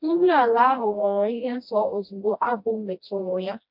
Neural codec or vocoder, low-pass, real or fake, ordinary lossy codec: codec, 16 kHz, 1 kbps, FreqCodec, smaller model; 5.4 kHz; fake; MP3, 48 kbps